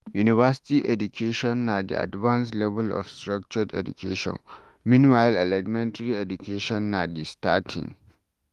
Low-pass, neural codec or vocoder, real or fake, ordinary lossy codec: 14.4 kHz; autoencoder, 48 kHz, 32 numbers a frame, DAC-VAE, trained on Japanese speech; fake; Opus, 24 kbps